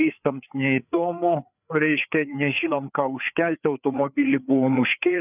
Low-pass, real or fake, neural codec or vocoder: 3.6 kHz; fake; codec, 16 kHz, 4 kbps, FreqCodec, larger model